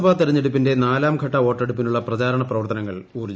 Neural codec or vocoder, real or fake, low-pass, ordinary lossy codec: none; real; none; none